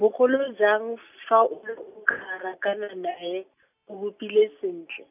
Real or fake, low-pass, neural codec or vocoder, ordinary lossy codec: real; 3.6 kHz; none; none